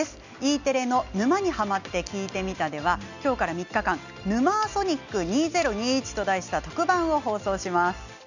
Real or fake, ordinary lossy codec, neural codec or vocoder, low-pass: real; none; none; 7.2 kHz